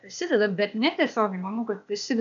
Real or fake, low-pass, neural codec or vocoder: fake; 7.2 kHz; codec, 16 kHz, 0.8 kbps, ZipCodec